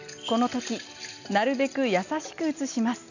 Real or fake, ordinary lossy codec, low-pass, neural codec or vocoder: real; none; 7.2 kHz; none